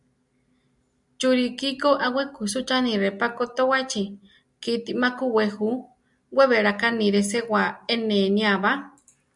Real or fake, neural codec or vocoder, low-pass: real; none; 10.8 kHz